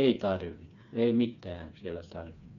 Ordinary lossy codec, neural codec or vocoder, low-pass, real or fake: none; codec, 16 kHz, 4 kbps, FreqCodec, smaller model; 7.2 kHz; fake